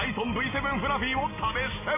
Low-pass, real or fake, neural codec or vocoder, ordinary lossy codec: 3.6 kHz; real; none; MP3, 16 kbps